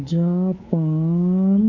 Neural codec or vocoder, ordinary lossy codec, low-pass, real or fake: codec, 24 kHz, 3.1 kbps, DualCodec; AAC, 48 kbps; 7.2 kHz; fake